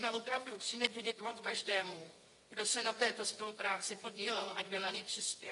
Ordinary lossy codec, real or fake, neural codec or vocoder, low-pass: AAC, 32 kbps; fake; codec, 24 kHz, 0.9 kbps, WavTokenizer, medium music audio release; 10.8 kHz